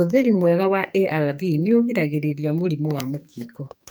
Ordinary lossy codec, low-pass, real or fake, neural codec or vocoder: none; none; fake; codec, 44.1 kHz, 2.6 kbps, SNAC